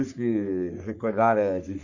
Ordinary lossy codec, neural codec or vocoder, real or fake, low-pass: none; codec, 44.1 kHz, 3.4 kbps, Pupu-Codec; fake; 7.2 kHz